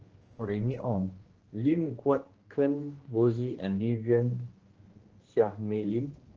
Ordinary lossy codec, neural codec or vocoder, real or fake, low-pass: Opus, 16 kbps; codec, 16 kHz, 1 kbps, X-Codec, HuBERT features, trained on general audio; fake; 7.2 kHz